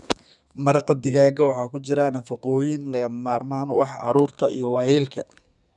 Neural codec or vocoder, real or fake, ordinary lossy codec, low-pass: codec, 32 kHz, 1.9 kbps, SNAC; fake; none; 10.8 kHz